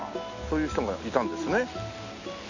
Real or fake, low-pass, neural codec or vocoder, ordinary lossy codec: real; 7.2 kHz; none; none